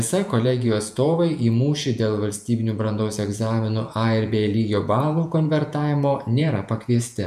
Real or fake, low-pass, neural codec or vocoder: fake; 14.4 kHz; vocoder, 48 kHz, 128 mel bands, Vocos